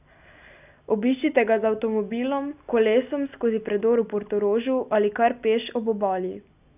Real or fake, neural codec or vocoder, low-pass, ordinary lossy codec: real; none; 3.6 kHz; none